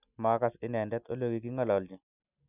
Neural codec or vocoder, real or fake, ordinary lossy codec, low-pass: none; real; none; 3.6 kHz